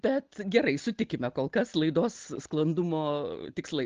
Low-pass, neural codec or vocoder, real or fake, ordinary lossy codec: 7.2 kHz; none; real; Opus, 16 kbps